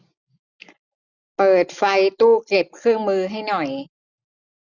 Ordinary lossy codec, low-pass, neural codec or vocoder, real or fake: none; 7.2 kHz; none; real